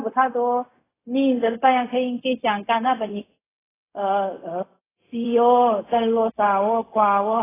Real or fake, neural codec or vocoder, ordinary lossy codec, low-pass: fake; codec, 16 kHz, 0.4 kbps, LongCat-Audio-Codec; AAC, 16 kbps; 3.6 kHz